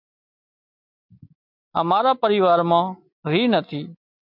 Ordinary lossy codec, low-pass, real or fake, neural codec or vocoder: MP3, 48 kbps; 5.4 kHz; real; none